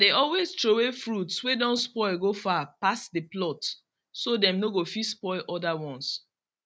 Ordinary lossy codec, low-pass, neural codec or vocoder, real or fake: none; none; none; real